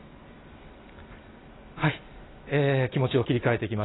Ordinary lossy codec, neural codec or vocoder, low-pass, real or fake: AAC, 16 kbps; none; 7.2 kHz; real